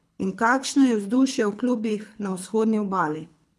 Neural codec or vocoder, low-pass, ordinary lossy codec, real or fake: codec, 24 kHz, 3 kbps, HILCodec; none; none; fake